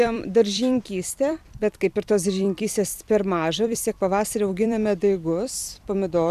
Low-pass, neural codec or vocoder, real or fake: 14.4 kHz; vocoder, 44.1 kHz, 128 mel bands every 256 samples, BigVGAN v2; fake